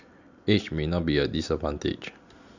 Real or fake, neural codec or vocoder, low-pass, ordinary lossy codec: real; none; 7.2 kHz; Opus, 64 kbps